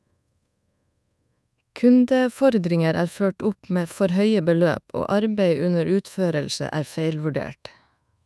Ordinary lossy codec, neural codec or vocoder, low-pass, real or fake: none; codec, 24 kHz, 1.2 kbps, DualCodec; none; fake